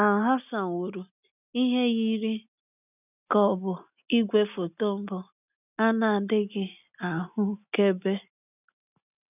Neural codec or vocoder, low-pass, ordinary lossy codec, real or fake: none; 3.6 kHz; none; real